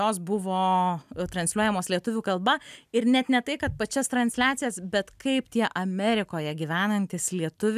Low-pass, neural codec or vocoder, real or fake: 14.4 kHz; none; real